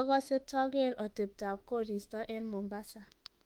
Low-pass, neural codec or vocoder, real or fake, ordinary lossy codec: 19.8 kHz; autoencoder, 48 kHz, 32 numbers a frame, DAC-VAE, trained on Japanese speech; fake; Opus, 32 kbps